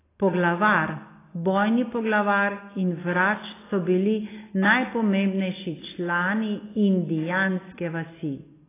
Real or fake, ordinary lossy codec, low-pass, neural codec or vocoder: real; AAC, 16 kbps; 3.6 kHz; none